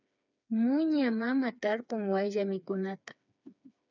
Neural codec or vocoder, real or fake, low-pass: codec, 16 kHz, 4 kbps, FreqCodec, smaller model; fake; 7.2 kHz